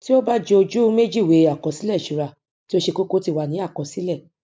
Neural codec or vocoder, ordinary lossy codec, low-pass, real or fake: none; none; none; real